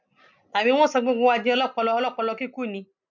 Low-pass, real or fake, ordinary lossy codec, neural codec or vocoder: 7.2 kHz; real; none; none